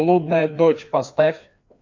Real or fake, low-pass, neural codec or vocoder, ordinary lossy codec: fake; 7.2 kHz; codec, 16 kHz, 2 kbps, FreqCodec, larger model; MP3, 64 kbps